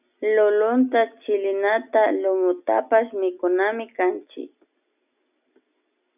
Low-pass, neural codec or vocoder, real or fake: 3.6 kHz; none; real